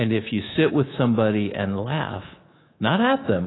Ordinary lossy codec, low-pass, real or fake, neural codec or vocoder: AAC, 16 kbps; 7.2 kHz; fake; codec, 24 kHz, 3.1 kbps, DualCodec